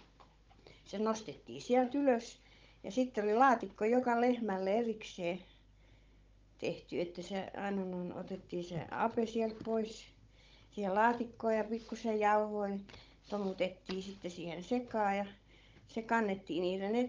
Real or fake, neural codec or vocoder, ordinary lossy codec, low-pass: fake; codec, 16 kHz, 16 kbps, FunCodec, trained on Chinese and English, 50 frames a second; Opus, 24 kbps; 7.2 kHz